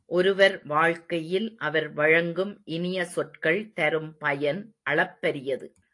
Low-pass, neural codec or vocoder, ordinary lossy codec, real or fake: 10.8 kHz; none; AAC, 48 kbps; real